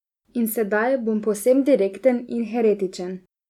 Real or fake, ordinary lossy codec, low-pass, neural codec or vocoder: real; none; 19.8 kHz; none